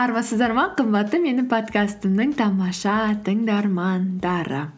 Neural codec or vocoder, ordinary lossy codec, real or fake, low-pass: none; none; real; none